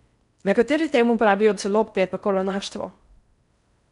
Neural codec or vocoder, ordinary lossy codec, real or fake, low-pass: codec, 16 kHz in and 24 kHz out, 0.6 kbps, FocalCodec, streaming, 4096 codes; none; fake; 10.8 kHz